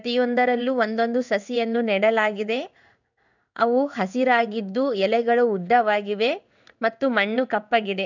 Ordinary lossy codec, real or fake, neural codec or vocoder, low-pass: none; fake; codec, 16 kHz in and 24 kHz out, 1 kbps, XY-Tokenizer; 7.2 kHz